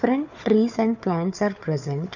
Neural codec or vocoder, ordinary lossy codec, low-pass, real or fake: codec, 16 kHz, 4 kbps, FunCodec, trained on Chinese and English, 50 frames a second; none; 7.2 kHz; fake